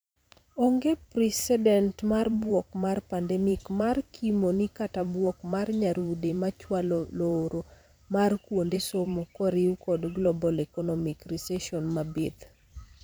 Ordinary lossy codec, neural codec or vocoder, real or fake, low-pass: none; vocoder, 44.1 kHz, 128 mel bands every 512 samples, BigVGAN v2; fake; none